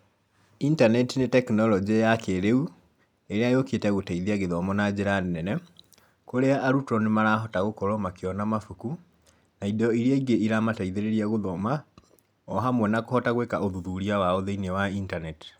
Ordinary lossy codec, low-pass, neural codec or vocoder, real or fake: none; 19.8 kHz; none; real